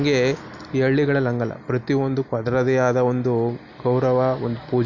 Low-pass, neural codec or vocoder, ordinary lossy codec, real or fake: 7.2 kHz; none; none; real